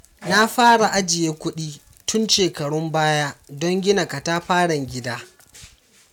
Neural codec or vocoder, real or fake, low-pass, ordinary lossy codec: none; real; 19.8 kHz; none